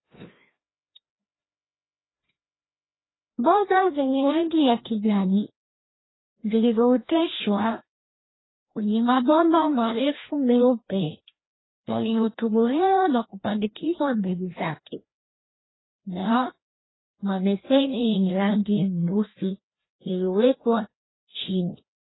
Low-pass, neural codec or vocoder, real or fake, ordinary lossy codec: 7.2 kHz; codec, 16 kHz, 1 kbps, FreqCodec, larger model; fake; AAC, 16 kbps